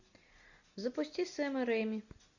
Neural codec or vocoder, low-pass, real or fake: none; 7.2 kHz; real